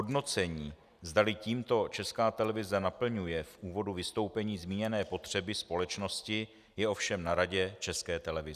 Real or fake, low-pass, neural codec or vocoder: real; 14.4 kHz; none